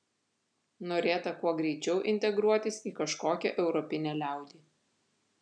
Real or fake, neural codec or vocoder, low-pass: real; none; 9.9 kHz